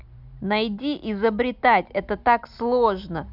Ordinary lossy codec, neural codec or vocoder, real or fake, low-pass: none; none; real; 5.4 kHz